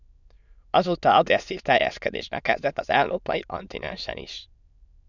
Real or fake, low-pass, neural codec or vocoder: fake; 7.2 kHz; autoencoder, 22.05 kHz, a latent of 192 numbers a frame, VITS, trained on many speakers